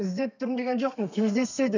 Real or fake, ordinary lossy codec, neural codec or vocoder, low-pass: fake; none; codec, 32 kHz, 1.9 kbps, SNAC; 7.2 kHz